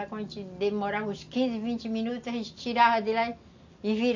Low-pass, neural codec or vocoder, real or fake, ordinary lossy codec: 7.2 kHz; none; real; AAC, 48 kbps